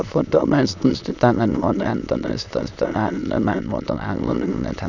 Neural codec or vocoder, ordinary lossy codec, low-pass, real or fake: autoencoder, 22.05 kHz, a latent of 192 numbers a frame, VITS, trained on many speakers; none; 7.2 kHz; fake